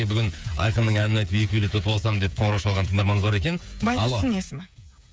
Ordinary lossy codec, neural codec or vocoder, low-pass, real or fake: none; codec, 16 kHz, 8 kbps, FreqCodec, smaller model; none; fake